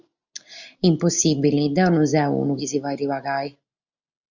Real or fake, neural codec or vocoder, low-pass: real; none; 7.2 kHz